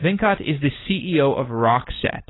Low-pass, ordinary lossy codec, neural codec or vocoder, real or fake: 7.2 kHz; AAC, 16 kbps; codec, 16 kHz, 16 kbps, FunCodec, trained on LibriTTS, 50 frames a second; fake